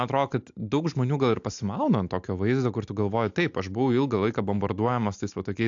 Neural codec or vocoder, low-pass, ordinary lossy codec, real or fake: none; 7.2 kHz; AAC, 64 kbps; real